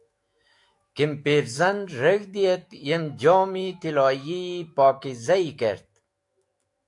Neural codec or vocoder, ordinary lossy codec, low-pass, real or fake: autoencoder, 48 kHz, 128 numbers a frame, DAC-VAE, trained on Japanese speech; AAC, 64 kbps; 10.8 kHz; fake